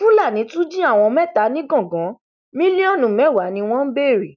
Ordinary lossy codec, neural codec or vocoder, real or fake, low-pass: none; none; real; 7.2 kHz